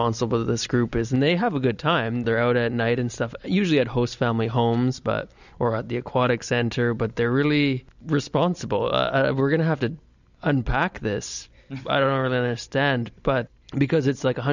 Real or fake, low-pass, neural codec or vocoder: real; 7.2 kHz; none